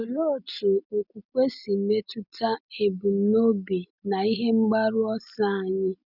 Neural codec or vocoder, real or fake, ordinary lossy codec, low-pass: none; real; none; 5.4 kHz